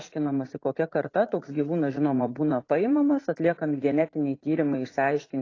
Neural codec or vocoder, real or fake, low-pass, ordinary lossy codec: vocoder, 44.1 kHz, 80 mel bands, Vocos; fake; 7.2 kHz; AAC, 32 kbps